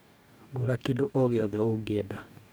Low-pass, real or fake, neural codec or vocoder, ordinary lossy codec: none; fake; codec, 44.1 kHz, 2.6 kbps, DAC; none